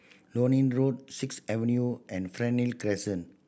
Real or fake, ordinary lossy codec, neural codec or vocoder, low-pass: real; none; none; none